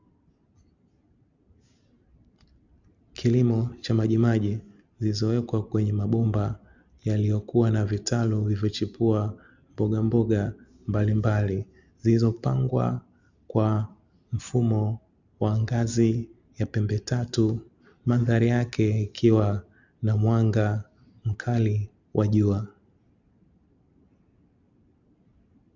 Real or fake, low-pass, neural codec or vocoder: real; 7.2 kHz; none